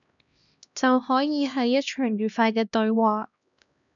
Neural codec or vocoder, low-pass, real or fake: codec, 16 kHz, 1 kbps, X-Codec, HuBERT features, trained on LibriSpeech; 7.2 kHz; fake